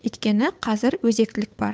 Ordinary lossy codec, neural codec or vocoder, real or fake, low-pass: none; codec, 16 kHz, 8 kbps, FunCodec, trained on Chinese and English, 25 frames a second; fake; none